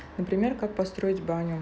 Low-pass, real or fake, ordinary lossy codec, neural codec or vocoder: none; real; none; none